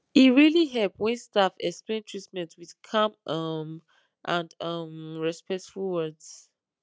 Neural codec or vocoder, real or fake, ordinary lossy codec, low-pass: none; real; none; none